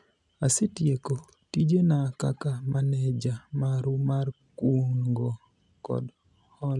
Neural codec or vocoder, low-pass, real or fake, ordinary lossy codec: none; 10.8 kHz; real; none